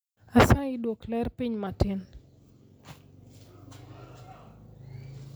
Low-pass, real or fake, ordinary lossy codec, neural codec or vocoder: none; real; none; none